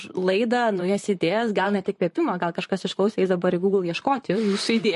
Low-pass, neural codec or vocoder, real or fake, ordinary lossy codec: 14.4 kHz; vocoder, 44.1 kHz, 128 mel bands, Pupu-Vocoder; fake; MP3, 48 kbps